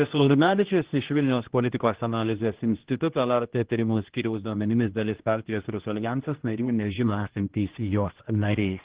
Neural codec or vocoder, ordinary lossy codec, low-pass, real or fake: codec, 16 kHz, 1 kbps, X-Codec, HuBERT features, trained on general audio; Opus, 16 kbps; 3.6 kHz; fake